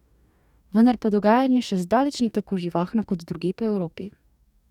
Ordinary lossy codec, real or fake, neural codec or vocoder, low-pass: none; fake; codec, 44.1 kHz, 2.6 kbps, DAC; 19.8 kHz